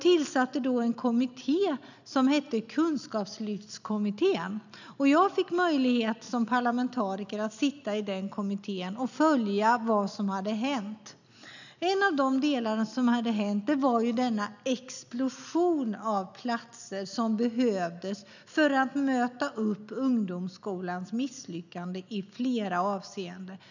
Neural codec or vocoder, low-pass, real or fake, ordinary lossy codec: none; 7.2 kHz; real; none